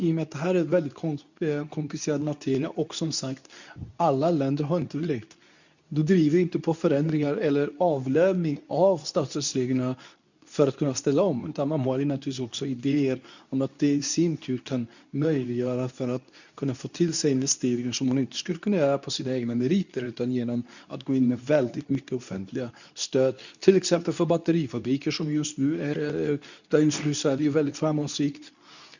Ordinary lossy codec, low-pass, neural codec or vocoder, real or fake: none; 7.2 kHz; codec, 24 kHz, 0.9 kbps, WavTokenizer, medium speech release version 2; fake